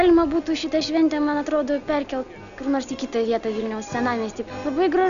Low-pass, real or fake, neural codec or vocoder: 7.2 kHz; real; none